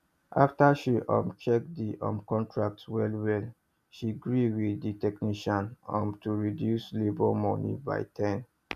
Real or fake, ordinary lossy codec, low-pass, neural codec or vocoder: real; none; 14.4 kHz; none